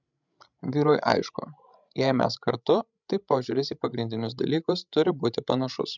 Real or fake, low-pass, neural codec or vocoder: fake; 7.2 kHz; codec, 16 kHz, 16 kbps, FreqCodec, larger model